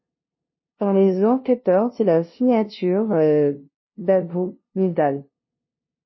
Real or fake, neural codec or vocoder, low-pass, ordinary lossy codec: fake; codec, 16 kHz, 0.5 kbps, FunCodec, trained on LibriTTS, 25 frames a second; 7.2 kHz; MP3, 24 kbps